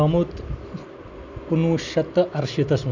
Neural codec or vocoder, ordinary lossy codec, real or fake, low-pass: none; none; real; 7.2 kHz